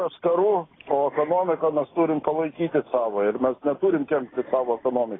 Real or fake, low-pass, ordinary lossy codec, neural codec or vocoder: real; 7.2 kHz; AAC, 16 kbps; none